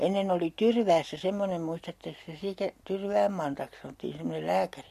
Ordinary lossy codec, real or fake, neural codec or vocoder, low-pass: MP3, 64 kbps; real; none; 14.4 kHz